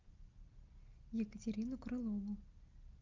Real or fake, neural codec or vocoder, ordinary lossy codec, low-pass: real; none; Opus, 24 kbps; 7.2 kHz